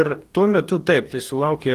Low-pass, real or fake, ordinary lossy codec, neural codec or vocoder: 14.4 kHz; fake; Opus, 24 kbps; codec, 44.1 kHz, 2.6 kbps, DAC